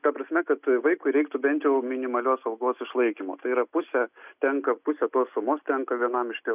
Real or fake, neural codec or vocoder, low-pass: real; none; 3.6 kHz